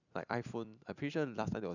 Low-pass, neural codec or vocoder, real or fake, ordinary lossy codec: 7.2 kHz; vocoder, 44.1 kHz, 128 mel bands every 256 samples, BigVGAN v2; fake; none